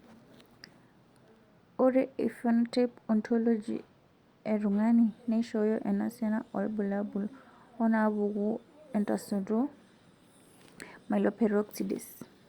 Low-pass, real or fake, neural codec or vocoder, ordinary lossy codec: 19.8 kHz; real; none; Opus, 64 kbps